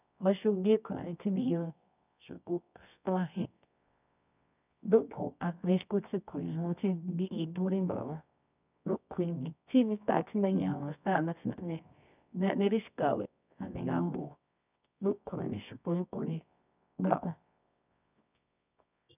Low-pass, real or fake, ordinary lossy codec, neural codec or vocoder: 3.6 kHz; fake; none; codec, 24 kHz, 0.9 kbps, WavTokenizer, medium music audio release